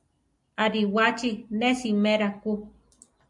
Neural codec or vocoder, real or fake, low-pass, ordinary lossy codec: none; real; 10.8 kHz; MP3, 96 kbps